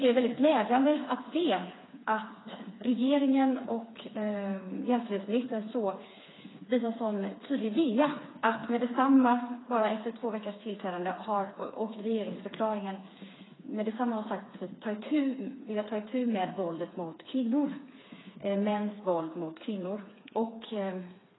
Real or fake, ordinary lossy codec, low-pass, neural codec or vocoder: fake; AAC, 16 kbps; 7.2 kHz; codec, 16 kHz, 4 kbps, FreqCodec, smaller model